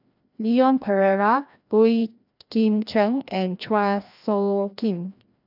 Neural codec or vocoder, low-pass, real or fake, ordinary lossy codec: codec, 16 kHz, 1 kbps, FreqCodec, larger model; 5.4 kHz; fake; none